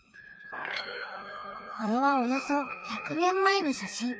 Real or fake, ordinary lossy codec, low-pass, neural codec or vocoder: fake; none; none; codec, 16 kHz, 2 kbps, FreqCodec, larger model